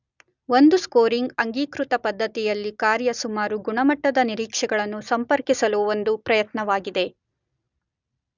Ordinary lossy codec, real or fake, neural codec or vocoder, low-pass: none; real; none; 7.2 kHz